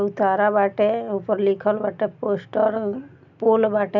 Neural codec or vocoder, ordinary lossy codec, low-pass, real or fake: none; none; 7.2 kHz; real